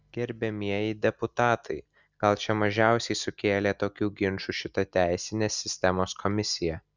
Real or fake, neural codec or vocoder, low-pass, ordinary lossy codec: real; none; 7.2 kHz; Opus, 64 kbps